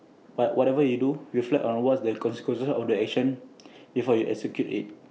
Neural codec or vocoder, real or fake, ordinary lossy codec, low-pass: none; real; none; none